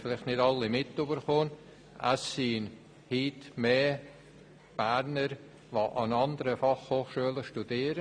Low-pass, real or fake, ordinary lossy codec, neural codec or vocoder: 9.9 kHz; real; none; none